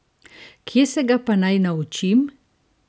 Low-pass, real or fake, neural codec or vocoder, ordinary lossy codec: none; real; none; none